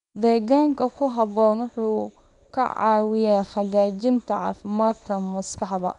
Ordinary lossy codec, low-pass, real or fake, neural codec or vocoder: none; 10.8 kHz; fake; codec, 24 kHz, 0.9 kbps, WavTokenizer, small release